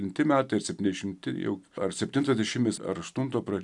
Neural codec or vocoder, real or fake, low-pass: none; real; 10.8 kHz